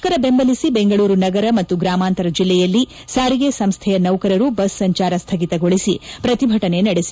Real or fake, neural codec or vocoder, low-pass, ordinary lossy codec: real; none; none; none